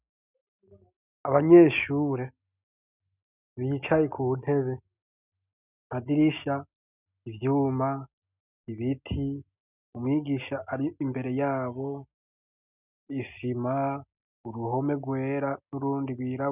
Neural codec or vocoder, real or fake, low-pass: none; real; 3.6 kHz